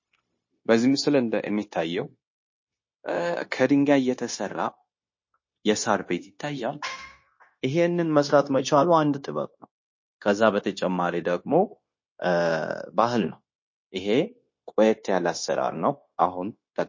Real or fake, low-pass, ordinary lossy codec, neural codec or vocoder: fake; 7.2 kHz; MP3, 32 kbps; codec, 16 kHz, 0.9 kbps, LongCat-Audio-Codec